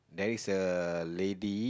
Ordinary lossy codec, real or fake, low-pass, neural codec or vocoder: none; real; none; none